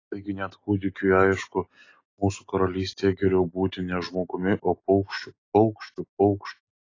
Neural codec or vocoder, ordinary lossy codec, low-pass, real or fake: none; AAC, 32 kbps; 7.2 kHz; real